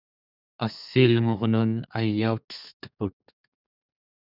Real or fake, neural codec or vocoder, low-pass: fake; codec, 32 kHz, 1.9 kbps, SNAC; 5.4 kHz